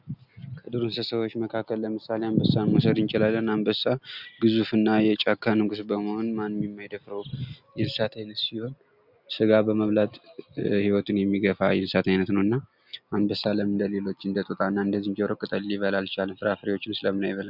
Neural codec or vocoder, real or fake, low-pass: none; real; 5.4 kHz